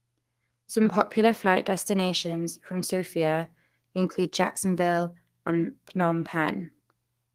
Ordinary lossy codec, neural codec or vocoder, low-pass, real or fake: Opus, 24 kbps; codec, 32 kHz, 1.9 kbps, SNAC; 14.4 kHz; fake